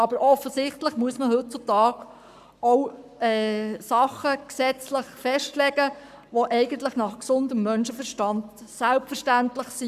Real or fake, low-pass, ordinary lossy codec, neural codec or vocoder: fake; 14.4 kHz; none; codec, 44.1 kHz, 7.8 kbps, Pupu-Codec